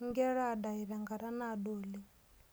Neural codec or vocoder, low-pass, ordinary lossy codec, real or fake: none; none; none; real